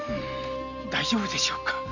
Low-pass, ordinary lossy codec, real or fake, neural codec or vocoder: 7.2 kHz; none; real; none